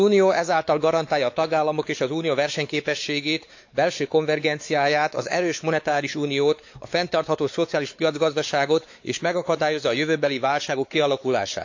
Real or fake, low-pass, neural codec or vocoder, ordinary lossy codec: fake; 7.2 kHz; codec, 16 kHz, 4 kbps, X-Codec, WavLM features, trained on Multilingual LibriSpeech; AAC, 48 kbps